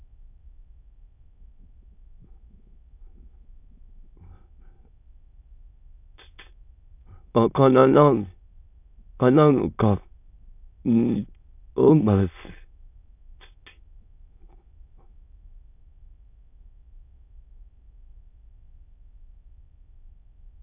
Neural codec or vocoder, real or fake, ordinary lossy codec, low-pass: autoencoder, 22.05 kHz, a latent of 192 numbers a frame, VITS, trained on many speakers; fake; none; 3.6 kHz